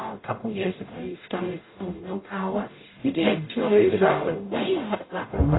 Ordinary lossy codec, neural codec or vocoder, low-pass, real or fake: AAC, 16 kbps; codec, 44.1 kHz, 0.9 kbps, DAC; 7.2 kHz; fake